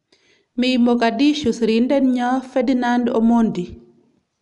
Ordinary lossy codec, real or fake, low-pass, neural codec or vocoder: none; real; 10.8 kHz; none